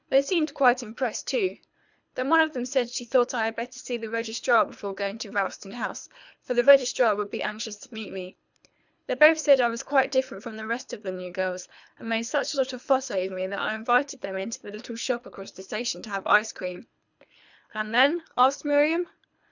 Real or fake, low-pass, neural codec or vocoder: fake; 7.2 kHz; codec, 24 kHz, 3 kbps, HILCodec